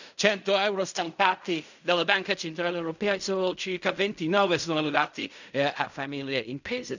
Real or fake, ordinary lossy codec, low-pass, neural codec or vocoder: fake; none; 7.2 kHz; codec, 16 kHz in and 24 kHz out, 0.4 kbps, LongCat-Audio-Codec, fine tuned four codebook decoder